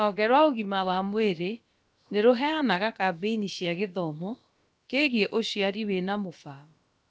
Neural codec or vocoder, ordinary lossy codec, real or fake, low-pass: codec, 16 kHz, about 1 kbps, DyCAST, with the encoder's durations; none; fake; none